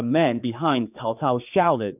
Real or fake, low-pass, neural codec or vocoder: fake; 3.6 kHz; codec, 16 kHz, 4 kbps, X-Codec, HuBERT features, trained on general audio